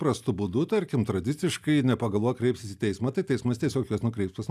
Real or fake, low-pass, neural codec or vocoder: real; 14.4 kHz; none